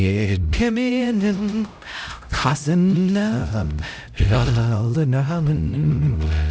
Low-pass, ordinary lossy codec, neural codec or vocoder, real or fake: none; none; codec, 16 kHz, 0.5 kbps, X-Codec, HuBERT features, trained on LibriSpeech; fake